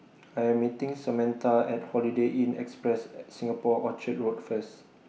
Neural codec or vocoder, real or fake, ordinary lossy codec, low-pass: none; real; none; none